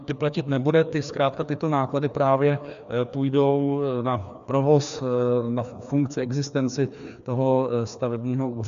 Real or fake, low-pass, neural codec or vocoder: fake; 7.2 kHz; codec, 16 kHz, 2 kbps, FreqCodec, larger model